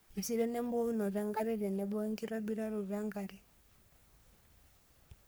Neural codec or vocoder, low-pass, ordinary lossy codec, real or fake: codec, 44.1 kHz, 3.4 kbps, Pupu-Codec; none; none; fake